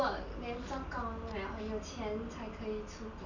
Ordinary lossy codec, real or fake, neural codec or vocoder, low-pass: none; real; none; 7.2 kHz